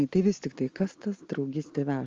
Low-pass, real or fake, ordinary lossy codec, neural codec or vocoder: 7.2 kHz; real; Opus, 32 kbps; none